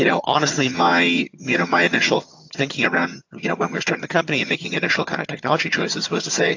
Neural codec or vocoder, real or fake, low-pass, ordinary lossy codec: vocoder, 22.05 kHz, 80 mel bands, HiFi-GAN; fake; 7.2 kHz; AAC, 48 kbps